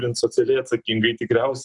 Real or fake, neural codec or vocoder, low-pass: real; none; 10.8 kHz